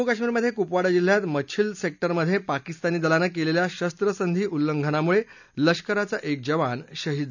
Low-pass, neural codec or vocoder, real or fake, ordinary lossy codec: 7.2 kHz; none; real; none